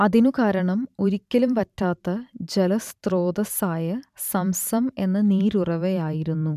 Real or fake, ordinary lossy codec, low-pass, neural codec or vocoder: fake; none; 14.4 kHz; vocoder, 44.1 kHz, 128 mel bands every 512 samples, BigVGAN v2